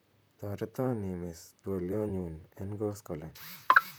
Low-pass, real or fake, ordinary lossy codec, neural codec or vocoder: none; fake; none; vocoder, 44.1 kHz, 128 mel bands, Pupu-Vocoder